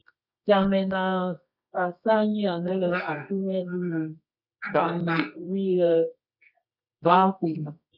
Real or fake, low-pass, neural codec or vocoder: fake; 5.4 kHz; codec, 24 kHz, 0.9 kbps, WavTokenizer, medium music audio release